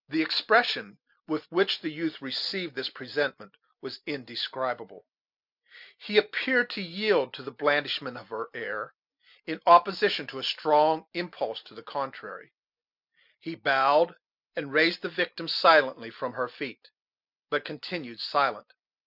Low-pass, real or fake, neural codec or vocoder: 5.4 kHz; real; none